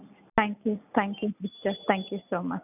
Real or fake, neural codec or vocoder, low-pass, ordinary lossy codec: real; none; 3.6 kHz; none